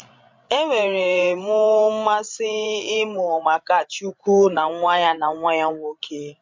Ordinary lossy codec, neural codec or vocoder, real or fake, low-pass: MP3, 64 kbps; codec, 16 kHz, 16 kbps, FreqCodec, larger model; fake; 7.2 kHz